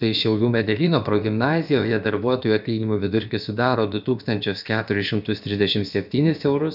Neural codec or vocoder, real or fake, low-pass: codec, 16 kHz, about 1 kbps, DyCAST, with the encoder's durations; fake; 5.4 kHz